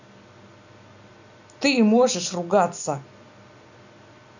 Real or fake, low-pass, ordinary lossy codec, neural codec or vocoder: real; 7.2 kHz; none; none